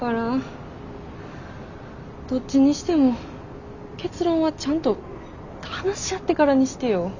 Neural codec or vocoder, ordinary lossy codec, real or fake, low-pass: none; none; real; 7.2 kHz